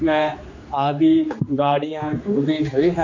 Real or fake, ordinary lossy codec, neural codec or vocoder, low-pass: fake; AAC, 48 kbps; codec, 16 kHz, 2 kbps, X-Codec, HuBERT features, trained on general audio; 7.2 kHz